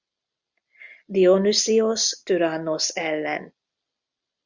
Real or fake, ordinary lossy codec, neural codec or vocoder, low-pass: real; Opus, 64 kbps; none; 7.2 kHz